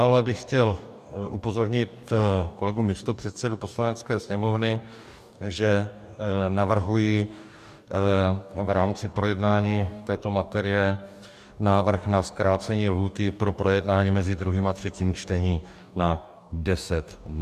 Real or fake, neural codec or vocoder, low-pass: fake; codec, 44.1 kHz, 2.6 kbps, DAC; 14.4 kHz